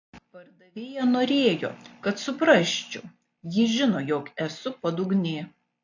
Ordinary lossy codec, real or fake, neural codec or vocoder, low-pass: AAC, 48 kbps; real; none; 7.2 kHz